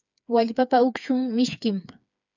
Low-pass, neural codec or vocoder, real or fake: 7.2 kHz; codec, 16 kHz, 4 kbps, FreqCodec, smaller model; fake